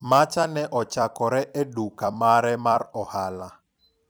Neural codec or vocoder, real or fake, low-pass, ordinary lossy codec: vocoder, 44.1 kHz, 128 mel bands every 256 samples, BigVGAN v2; fake; none; none